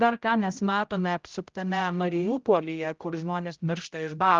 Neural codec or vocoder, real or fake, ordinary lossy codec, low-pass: codec, 16 kHz, 0.5 kbps, X-Codec, HuBERT features, trained on general audio; fake; Opus, 24 kbps; 7.2 kHz